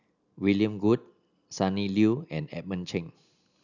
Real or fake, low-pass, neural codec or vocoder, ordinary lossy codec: real; 7.2 kHz; none; none